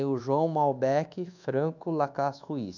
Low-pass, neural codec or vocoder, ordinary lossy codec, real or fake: 7.2 kHz; codec, 24 kHz, 1.2 kbps, DualCodec; none; fake